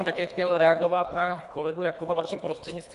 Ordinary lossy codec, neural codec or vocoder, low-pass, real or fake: MP3, 96 kbps; codec, 24 kHz, 1.5 kbps, HILCodec; 10.8 kHz; fake